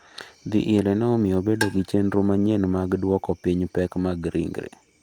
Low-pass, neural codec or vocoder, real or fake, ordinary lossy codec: 19.8 kHz; none; real; Opus, 32 kbps